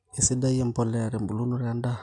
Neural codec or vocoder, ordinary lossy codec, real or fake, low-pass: none; MP3, 64 kbps; real; 10.8 kHz